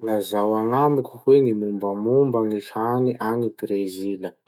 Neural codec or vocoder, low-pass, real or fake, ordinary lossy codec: autoencoder, 48 kHz, 128 numbers a frame, DAC-VAE, trained on Japanese speech; 19.8 kHz; fake; none